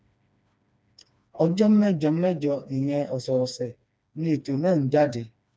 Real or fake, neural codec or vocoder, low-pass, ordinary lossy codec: fake; codec, 16 kHz, 2 kbps, FreqCodec, smaller model; none; none